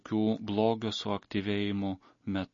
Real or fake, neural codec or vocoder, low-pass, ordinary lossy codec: real; none; 7.2 kHz; MP3, 32 kbps